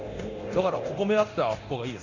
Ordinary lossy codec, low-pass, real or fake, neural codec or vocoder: none; 7.2 kHz; fake; codec, 24 kHz, 0.9 kbps, DualCodec